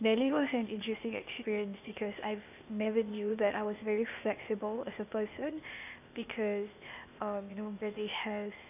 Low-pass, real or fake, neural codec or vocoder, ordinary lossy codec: 3.6 kHz; fake; codec, 16 kHz, 0.8 kbps, ZipCodec; none